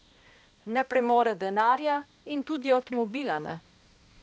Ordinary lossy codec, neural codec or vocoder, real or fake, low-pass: none; codec, 16 kHz, 1 kbps, X-Codec, HuBERT features, trained on balanced general audio; fake; none